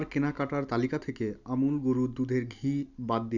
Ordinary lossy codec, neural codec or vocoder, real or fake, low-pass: none; none; real; 7.2 kHz